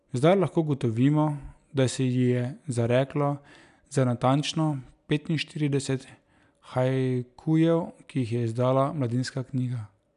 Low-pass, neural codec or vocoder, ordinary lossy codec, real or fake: 10.8 kHz; none; AAC, 96 kbps; real